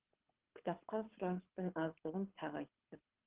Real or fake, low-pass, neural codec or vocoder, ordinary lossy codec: fake; 3.6 kHz; codec, 24 kHz, 6 kbps, HILCodec; Opus, 16 kbps